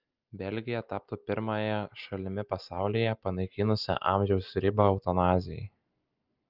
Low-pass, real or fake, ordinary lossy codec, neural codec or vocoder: 5.4 kHz; real; Opus, 32 kbps; none